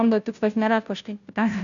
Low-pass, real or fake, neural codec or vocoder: 7.2 kHz; fake; codec, 16 kHz, 0.5 kbps, FunCodec, trained on Chinese and English, 25 frames a second